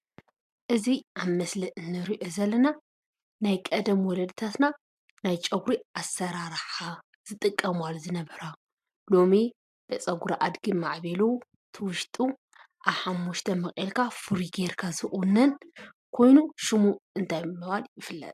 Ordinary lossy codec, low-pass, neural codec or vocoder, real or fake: MP3, 96 kbps; 14.4 kHz; none; real